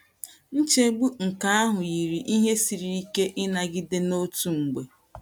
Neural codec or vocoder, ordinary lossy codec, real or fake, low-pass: none; none; real; none